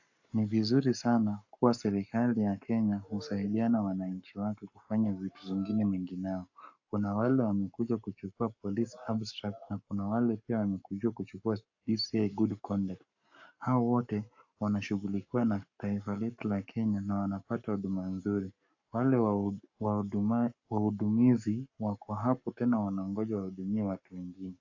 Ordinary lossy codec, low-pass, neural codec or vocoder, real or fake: AAC, 48 kbps; 7.2 kHz; codec, 44.1 kHz, 7.8 kbps, Pupu-Codec; fake